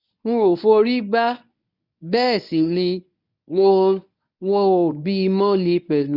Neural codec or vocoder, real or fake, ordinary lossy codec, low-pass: codec, 24 kHz, 0.9 kbps, WavTokenizer, medium speech release version 1; fake; none; 5.4 kHz